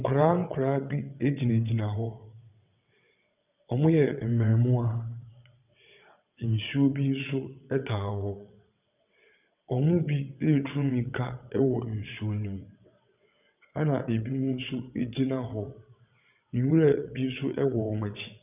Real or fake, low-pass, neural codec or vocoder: fake; 3.6 kHz; vocoder, 22.05 kHz, 80 mel bands, WaveNeXt